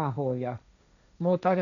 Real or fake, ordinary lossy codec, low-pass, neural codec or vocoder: fake; none; 7.2 kHz; codec, 16 kHz, 1.1 kbps, Voila-Tokenizer